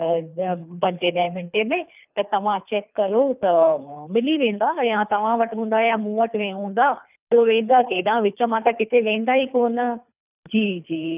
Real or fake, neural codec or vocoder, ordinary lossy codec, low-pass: fake; codec, 24 kHz, 3 kbps, HILCodec; none; 3.6 kHz